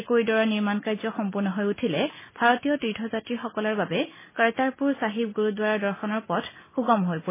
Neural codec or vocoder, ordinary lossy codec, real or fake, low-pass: none; MP3, 16 kbps; real; 3.6 kHz